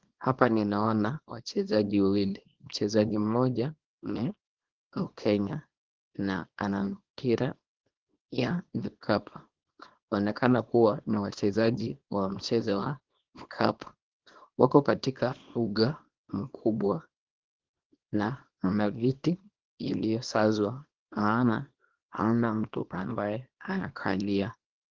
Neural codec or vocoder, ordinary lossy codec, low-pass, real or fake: codec, 24 kHz, 0.9 kbps, WavTokenizer, small release; Opus, 16 kbps; 7.2 kHz; fake